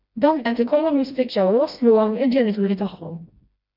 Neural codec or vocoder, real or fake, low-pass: codec, 16 kHz, 1 kbps, FreqCodec, smaller model; fake; 5.4 kHz